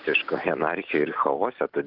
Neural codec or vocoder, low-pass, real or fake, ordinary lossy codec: none; 5.4 kHz; real; Opus, 24 kbps